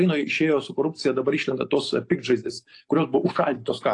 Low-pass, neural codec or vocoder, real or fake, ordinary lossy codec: 10.8 kHz; none; real; AAC, 48 kbps